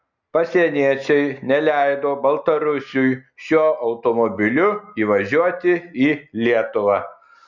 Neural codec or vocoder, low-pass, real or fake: none; 7.2 kHz; real